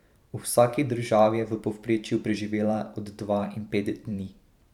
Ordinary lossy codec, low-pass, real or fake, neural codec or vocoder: none; 19.8 kHz; fake; vocoder, 44.1 kHz, 128 mel bands every 512 samples, BigVGAN v2